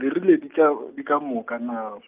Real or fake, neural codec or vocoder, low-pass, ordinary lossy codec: real; none; 3.6 kHz; Opus, 32 kbps